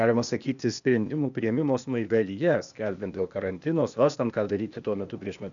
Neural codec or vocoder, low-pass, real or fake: codec, 16 kHz, 0.8 kbps, ZipCodec; 7.2 kHz; fake